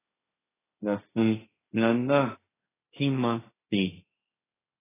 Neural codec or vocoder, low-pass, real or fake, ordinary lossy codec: codec, 16 kHz, 1.1 kbps, Voila-Tokenizer; 3.6 kHz; fake; AAC, 16 kbps